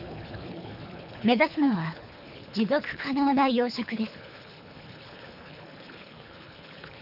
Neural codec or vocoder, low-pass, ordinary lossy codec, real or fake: codec, 24 kHz, 3 kbps, HILCodec; 5.4 kHz; none; fake